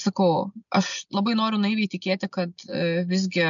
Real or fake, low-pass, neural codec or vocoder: real; 7.2 kHz; none